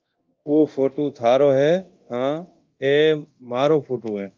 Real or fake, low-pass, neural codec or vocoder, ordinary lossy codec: fake; 7.2 kHz; codec, 24 kHz, 0.9 kbps, DualCodec; Opus, 32 kbps